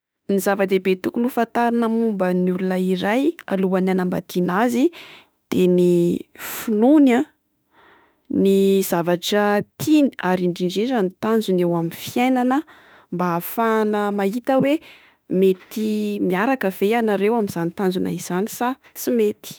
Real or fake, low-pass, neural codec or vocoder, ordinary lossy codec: fake; none; autoencoder, 48 kHz, 32 numbers a frame, DAC-VAE, trained on Japanese speech; none